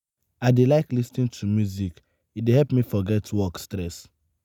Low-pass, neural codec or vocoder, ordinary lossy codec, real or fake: 19.8 kHz; none; none; real